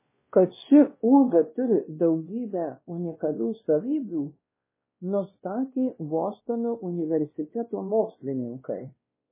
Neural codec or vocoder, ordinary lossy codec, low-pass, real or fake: codec, 16 kHz, 1 kbps, X-Codec, WavLM features, trained on Multilingual LibriSpeech; MP3, 16 kbps; 3.6 kHz; fake